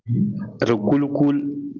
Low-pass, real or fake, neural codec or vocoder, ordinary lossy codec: 7.2 kHz; real; none; Opus, 24 kbps